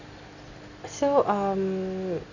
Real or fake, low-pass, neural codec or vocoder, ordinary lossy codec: real; 7.2 kHz; none; none